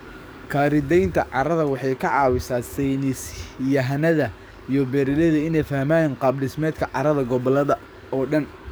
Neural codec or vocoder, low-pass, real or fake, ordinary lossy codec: codec, 44.1 kHz, 7.8 kbps, DAC; none; fake; none